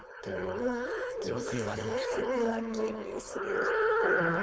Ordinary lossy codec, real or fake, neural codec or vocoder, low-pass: none; fake; codec, 16 kHz, 4.8 kbps, FACodec; none